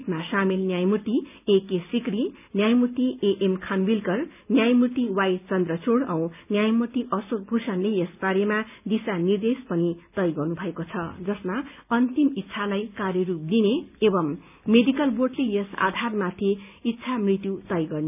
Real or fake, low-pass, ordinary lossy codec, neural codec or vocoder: real; 3.6 kHz; none; none